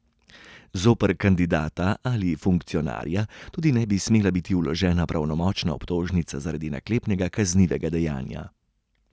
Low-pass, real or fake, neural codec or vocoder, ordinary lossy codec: none; real; none; none